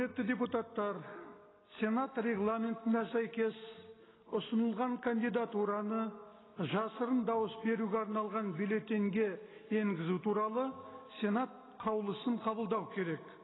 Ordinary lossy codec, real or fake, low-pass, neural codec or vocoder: AAC, 16 kbps; real; 7.2 kHz; none